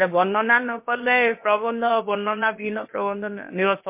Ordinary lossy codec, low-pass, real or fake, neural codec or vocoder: MP3, 24 kbps; 3.6 kHz; fake; codec, 16 kHz, about 1 kbps, DyCAST, with the encoder's durations